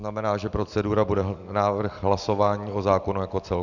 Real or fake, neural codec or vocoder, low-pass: real; none; 7.2 kHz